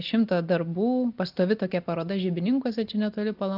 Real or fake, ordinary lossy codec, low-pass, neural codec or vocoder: real; Opus, 32 kbps; 5.4 kHz; none